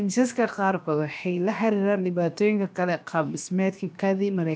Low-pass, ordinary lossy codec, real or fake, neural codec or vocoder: none; none; fake; codec, 16 kHz, about 1 kbps, DyCAST, with the encoder's durations